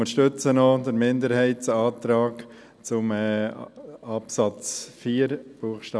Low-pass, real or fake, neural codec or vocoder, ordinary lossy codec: none; real; none; none